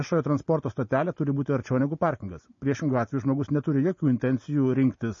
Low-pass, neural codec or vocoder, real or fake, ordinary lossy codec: 7.2 kHz; none; real; MP3, 32 kbps